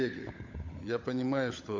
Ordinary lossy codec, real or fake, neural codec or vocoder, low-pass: MP3, 48 kbps; fake; codec, 16 kHz, 16 kbps, FunCodec, trained on LibriTTS, 50 frames a second; 7.2 kHz